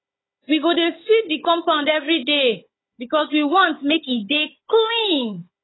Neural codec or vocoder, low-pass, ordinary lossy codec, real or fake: codec, 16 kHz, 16 kbps, FunCodec, trained on Chinese and English, 50 frames a second; 7.2 kHz; AAC, 16 kbps; fake